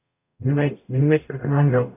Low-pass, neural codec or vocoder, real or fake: 3.6 kHz; codec, 44.1 kHz, 0.9 kbps, DAC; fake